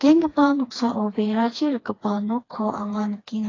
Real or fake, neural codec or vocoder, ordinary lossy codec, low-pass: fake; codec, 16 kHz, 2 kbps, FreqCodec, smaller model; AAC, 32 kbps; 7.2 kHz